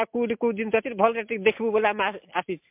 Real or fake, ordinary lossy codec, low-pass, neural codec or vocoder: real; MP3, 32 kbps; 3.6 kHz; none